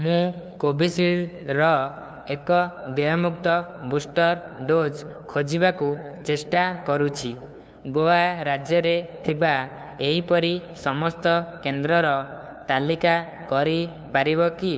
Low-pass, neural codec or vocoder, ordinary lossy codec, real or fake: none; codec, 16 kHz, 2 kbps, FunCodec, trained on LibriTTS, 25 frames a second; none; fake